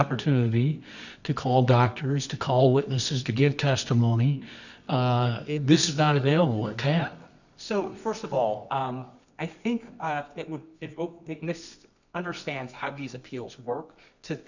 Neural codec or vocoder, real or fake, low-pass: codec, 24 kHz, 0.9 kbps, WavTokenizer, medium music audio release; fake; 7.2 kHz